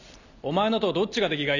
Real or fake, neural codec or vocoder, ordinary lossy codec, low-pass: real; none; none; 7.2 kHz